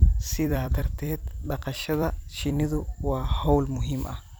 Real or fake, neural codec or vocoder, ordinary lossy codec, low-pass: fake; vocoder, 44.1 kHz, 128 mel bands every 256 samples, BigVGAN v2; none; none